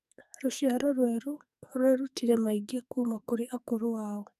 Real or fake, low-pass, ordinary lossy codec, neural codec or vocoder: fake; 14.4 kHz; none; codec, 44.1 kHz, 2.6 kbps, SNAC